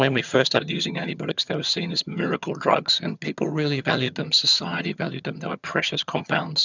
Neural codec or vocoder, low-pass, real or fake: vocoder, 22.05 kHz, 80 mel bands, HiFi-GAN; 7.2 kHz; fake